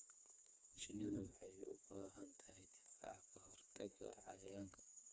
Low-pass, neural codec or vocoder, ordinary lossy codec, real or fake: none; codec, 16 kHz, 4 kbps, FreqCodec, smaller model; none; fake